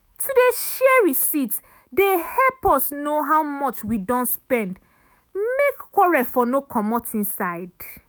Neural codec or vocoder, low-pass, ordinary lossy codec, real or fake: autoencoder, 48 kHz, 128 numbers a frame, DAC-VAE, trained on Japanese speech; none; none; fake